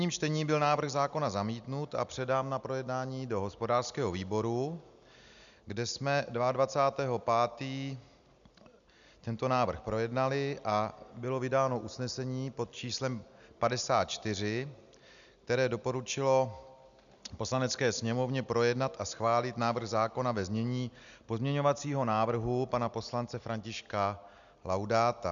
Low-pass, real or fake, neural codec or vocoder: 7.2 kHz; real; none